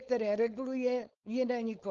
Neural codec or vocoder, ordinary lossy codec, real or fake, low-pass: codec, 16 kHz, 4.8 kbps, FACodec; Opus, 24 kbps; fake; 7.2 kHz